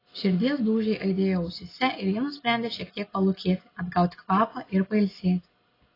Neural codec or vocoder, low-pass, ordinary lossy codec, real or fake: none; 5.4 kHz; AAC, 24 kbps; real